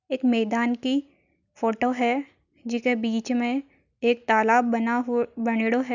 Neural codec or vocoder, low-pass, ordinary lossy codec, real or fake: none; 7.2 kHz; none; real